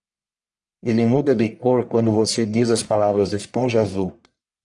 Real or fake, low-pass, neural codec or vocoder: fake; 10.8 kHz; codec, 44.1 kHz, 1.7 kbps, Pupu-Codec